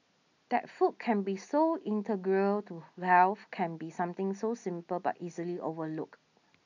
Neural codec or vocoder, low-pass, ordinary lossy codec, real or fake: none; 7.2 kHz; none; real